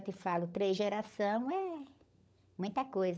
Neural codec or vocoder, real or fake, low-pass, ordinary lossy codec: codec, 16 kHz, 16 kbps, FunCodec, trained on LibriTTS, 50 frames a second; fake; none; none